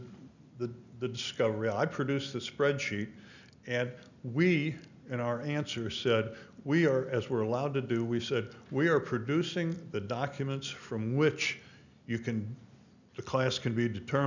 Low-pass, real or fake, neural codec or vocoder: 7.2 kHz; real; none